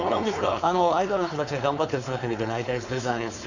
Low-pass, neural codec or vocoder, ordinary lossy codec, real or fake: 7.2 kHz; codec, 16 kHz, 4.8 kbps, FACodec; none; fake